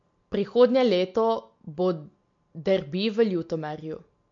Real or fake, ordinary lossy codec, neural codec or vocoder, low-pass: real; MP3, 48 kbps; none; 7.2 kHz